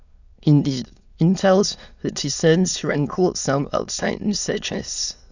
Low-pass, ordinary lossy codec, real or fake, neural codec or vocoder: 7.2 kHz; none; fake; autoencoder, 22.05 kHz, a latent of 192 numbers a frame, VITS, trained on many speakers